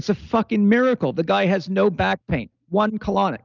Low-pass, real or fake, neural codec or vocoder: 7.2 kHz; real; none